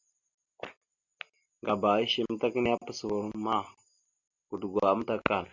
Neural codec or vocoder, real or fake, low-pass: none; real; 7.2 kHz